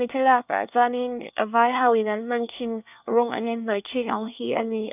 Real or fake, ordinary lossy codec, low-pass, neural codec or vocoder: fake; none; 3.6 kHz; codec, 24 kHz, 1 kbps, SNAC